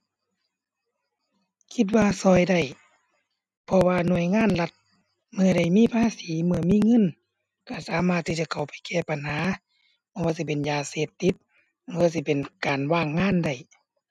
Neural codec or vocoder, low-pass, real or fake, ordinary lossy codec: none; none; real; none